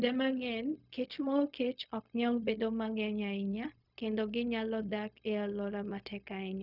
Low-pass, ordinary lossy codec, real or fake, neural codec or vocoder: 5.4 kHz; none; fake; codec, 16 kHz, 0.4 kbps, LongCat-Audio-Codec